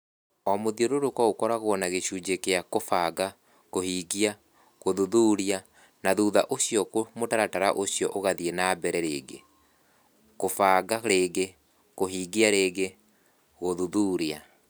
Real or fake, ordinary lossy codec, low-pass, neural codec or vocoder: real; none; none; none